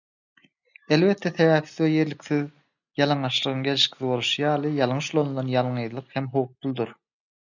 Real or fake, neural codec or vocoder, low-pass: real; none; 7.2 kHz